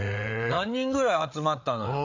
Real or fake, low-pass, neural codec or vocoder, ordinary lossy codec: fake; 7.2 kHz; codec, 16 kHz, 8 kbps, FreqCodec, larger model; MP3, 64 kbps